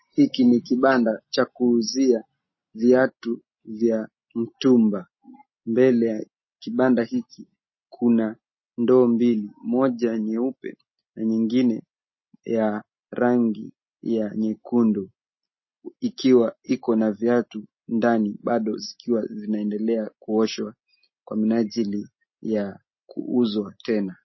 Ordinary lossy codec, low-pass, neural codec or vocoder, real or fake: MP3, 24 kbps; 7.2 kHz; none; real